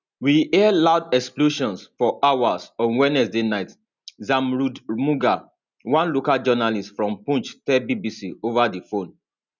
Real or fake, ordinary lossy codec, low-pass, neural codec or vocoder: real; none; 7.2 kHz; none